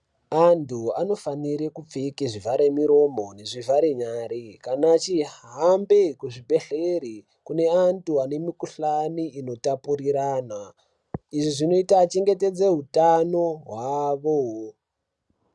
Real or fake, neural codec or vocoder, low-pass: real; none; 10.8 kHz